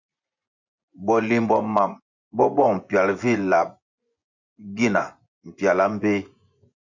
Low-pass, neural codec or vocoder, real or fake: 7.2 kHz; vocoder, 24 kHz, 100 mel bands, Vocos; fake